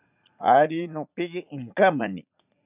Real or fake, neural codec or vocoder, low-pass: fake; vocoder, 44.1 kHz, 80 mel bands, Vocos; 3.6 kHz